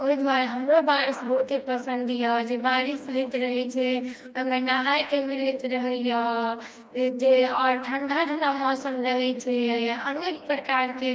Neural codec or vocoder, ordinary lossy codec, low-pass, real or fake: codec, 16 kHz, 1 kbps, FreqCodec, smaller model; none; none; fake